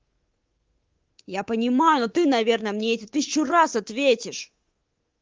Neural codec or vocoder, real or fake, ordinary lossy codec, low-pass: codec, 24 kHz, 3.1 kbps, DualCodec; fake; Opus, 16 kbps; 7.2 kHz